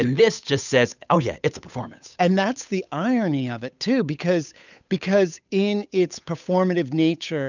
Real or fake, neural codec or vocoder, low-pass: fake; codec, 16 kHz, 8 kbps, FunCodec, trained on Chinese and English, 25 frames a second; 7.2 kHz